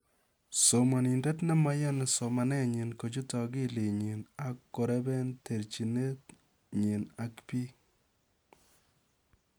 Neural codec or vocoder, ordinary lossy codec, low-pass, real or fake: none; none; none; real